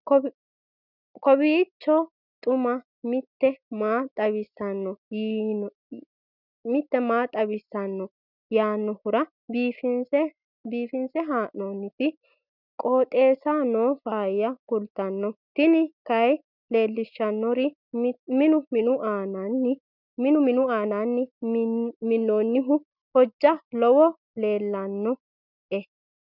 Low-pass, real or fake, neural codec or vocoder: 5.4 kHz; real; none